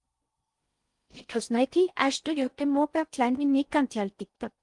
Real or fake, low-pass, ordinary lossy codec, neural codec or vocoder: fake; 10.8 kHz; Opus, 32 kbps; codec, 16 kHz in and 24 kHz out, 0.6 kbps, FocalCodec, streaming, 4096 codes